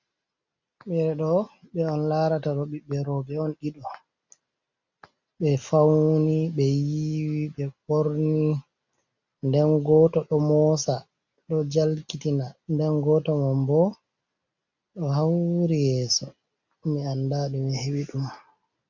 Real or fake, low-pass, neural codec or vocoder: real; 7.2 kHz; none